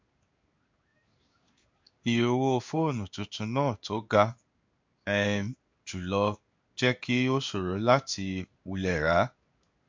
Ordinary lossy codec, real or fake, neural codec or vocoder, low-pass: MP3, 64 kbps; fake; codec, 16 kHz in and 24 kHz out, 1 kbps, XY-Tokenizer; 7.2 kHz